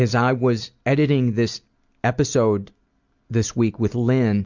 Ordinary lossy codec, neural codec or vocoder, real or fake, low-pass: Opus, 64 kbps; none; real; 7.2 kHz